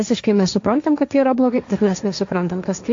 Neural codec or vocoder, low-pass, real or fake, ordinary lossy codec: codec, 16 kHz, 1.1 kbps, Voila-Tokenizer; 7.2 kHz; fake; AAC, 64 kbps